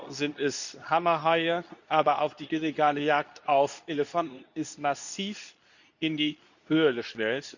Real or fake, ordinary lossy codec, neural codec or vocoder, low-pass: fake; none; codec, 24 kHz, 0.9 kbps, WavTokenizer, medium speech release version 2; 7.2 kHz